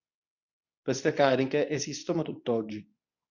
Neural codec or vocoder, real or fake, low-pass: codec, 24 kHz, 0.9 kbps, WavTokenizer, medium speech release version 2; fake; 7.2 kHz